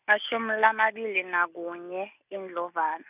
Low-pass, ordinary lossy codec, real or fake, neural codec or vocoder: 3.6 kHz; none; real; none